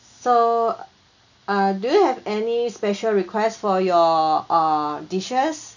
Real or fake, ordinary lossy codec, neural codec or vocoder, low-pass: real; none; none; 7.2 kHz